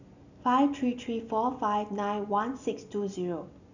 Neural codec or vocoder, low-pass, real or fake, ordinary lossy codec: none; 7.2 kHz; real; none